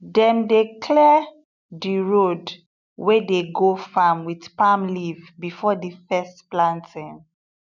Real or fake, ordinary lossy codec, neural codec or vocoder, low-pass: real; none; none; 7.2 kHz